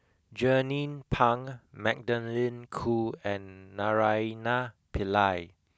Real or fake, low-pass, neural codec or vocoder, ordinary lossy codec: real; none; none; none